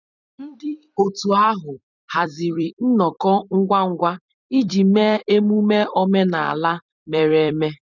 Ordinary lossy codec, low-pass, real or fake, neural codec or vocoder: none; 7.2 kHz; fake; vocoder, 44.1 kHz, 128 mel bands every 256 samples, BigVGAN v2